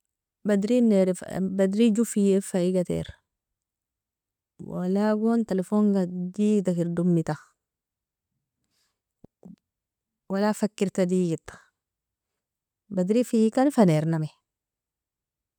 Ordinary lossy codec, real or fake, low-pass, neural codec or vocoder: none; real; 19.8 kHz; none